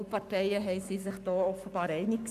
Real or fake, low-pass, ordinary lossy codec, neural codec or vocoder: fake; 14.4 kHz; none; codec, 44.1 kHz, 7.8 kbps, Pupu-Codec